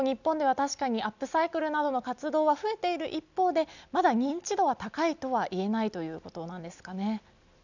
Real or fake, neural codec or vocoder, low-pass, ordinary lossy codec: real; none; 7.2 kHz; none